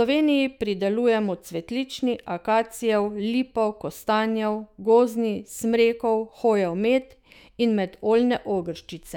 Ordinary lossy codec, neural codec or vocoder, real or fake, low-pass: none; autoencoder, 48 kHz, 128 numbers a frame, DAC-VAE, trained on Japanese speech; fake; 19.8 kHz